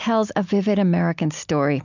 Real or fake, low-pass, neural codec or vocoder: real; 7.2 kHz; none